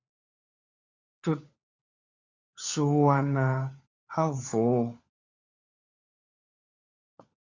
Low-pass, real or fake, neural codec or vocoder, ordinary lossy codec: 7.2 kHz; fake; codec, 16 kHz, 4 kbps, FunCodec, trained on LibriTTS, 50 frames a second; Opus, 64 kbps